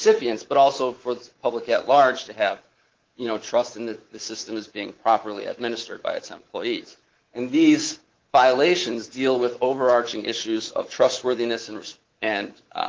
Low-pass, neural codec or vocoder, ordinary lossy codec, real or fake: 7.2 kHz; codec, 24 kHz, 3.1 kbps, DualCodec; Opus, 16 kbps; fake